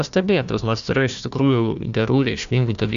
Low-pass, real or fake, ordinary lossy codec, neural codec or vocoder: 7.2 kHz; fake; Opus, 64 kbps; codec, 16 kHz, 1 kbps, FunCodec, trained on Chinese and English, 50 frames a second